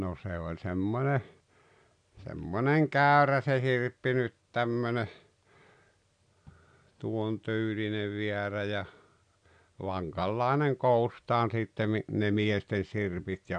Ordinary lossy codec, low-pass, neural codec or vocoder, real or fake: none; 9.9 kHz; none; real